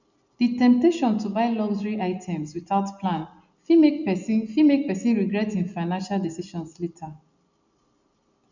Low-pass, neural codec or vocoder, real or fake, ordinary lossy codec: 7.2 kHz; none; real; none